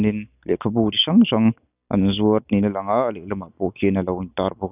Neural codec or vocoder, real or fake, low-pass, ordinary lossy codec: none; real; 3.6 kHz; none